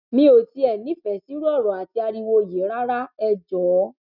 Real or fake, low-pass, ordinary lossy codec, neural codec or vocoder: real; 5.4 kHz; none; none